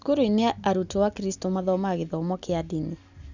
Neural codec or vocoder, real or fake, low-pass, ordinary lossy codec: none; real; 7.2 kHz; none